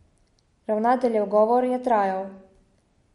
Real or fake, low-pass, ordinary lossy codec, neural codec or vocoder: real; 19.8 kHz; MP3, 48 kbps; none